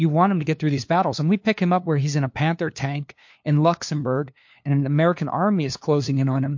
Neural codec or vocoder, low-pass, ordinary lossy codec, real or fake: codec, 16 kHz, 2 kbps, FunCodec, trained on LibriTTS, 25 frames a second; 7.2 kHz; MP3, 48 kbps; fake